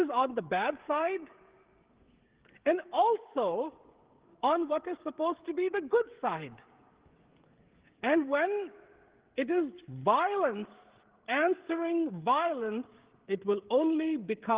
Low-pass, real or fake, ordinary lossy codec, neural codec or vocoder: 3.6 kHz; fake; Opus, 16 kbps; codec, 16 kHz, 16 kbps, FreqCodec, smaller model